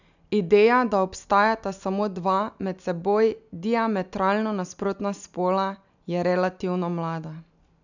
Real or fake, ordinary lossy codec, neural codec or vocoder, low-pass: real; none; none; 7.2 kHz